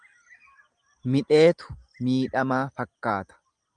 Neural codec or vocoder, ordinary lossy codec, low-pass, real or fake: none; Opus, 32 kbps; 9.9 kHz; real